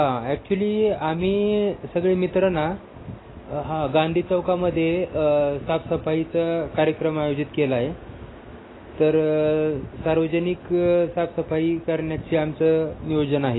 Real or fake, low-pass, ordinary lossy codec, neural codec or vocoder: real; 7.2 kHz; AAC, 16 kbps; none